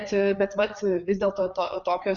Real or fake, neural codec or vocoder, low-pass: fake; codec, 16 kHz, 4 kbps, FreqCodec, larger model; 7.2 kHz